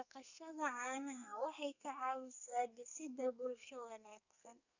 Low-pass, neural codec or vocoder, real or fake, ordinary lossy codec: 7.2 kHz; codec, 32 kHz, 1.9 kbps, SNAC; fake; none